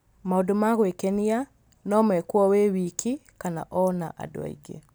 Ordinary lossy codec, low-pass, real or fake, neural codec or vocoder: none; none; real; none